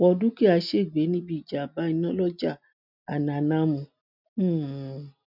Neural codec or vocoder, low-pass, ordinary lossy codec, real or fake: none; 5.4 kHz; none; real